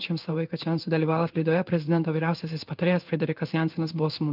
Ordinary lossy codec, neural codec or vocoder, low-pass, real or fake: Opus, 24 kbps; codec, 16 kHz in and 24 kHz out, 1 kbps, XY-Tokenizer; 5.4 kHz; fake